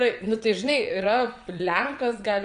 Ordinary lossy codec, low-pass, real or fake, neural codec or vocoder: AAC, 96 kbps; 9.9 kHz; fake; vocoder, 22.05 kHz, 80 mel bands, WaveNeXt